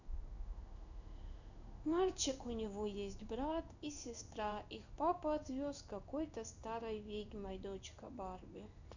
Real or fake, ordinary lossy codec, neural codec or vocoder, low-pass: fake; none; codec, 16 kHz in and 24 kHz out, 1 kbps, XY-Tokenizer; 7.2 kHz